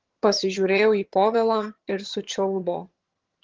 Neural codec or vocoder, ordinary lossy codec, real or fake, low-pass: vocoder, 22.05 kHz, 80 mel bands, HiFi-GAN; Opus, 16 kbps; fake; 7.2 kHz